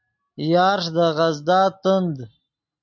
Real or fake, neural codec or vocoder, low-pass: real; none; 7.2 kHz